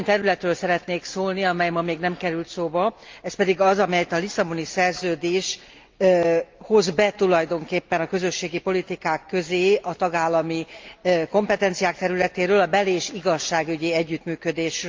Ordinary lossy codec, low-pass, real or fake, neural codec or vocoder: Opus, 24 kbps; 7.2 kHz; real; none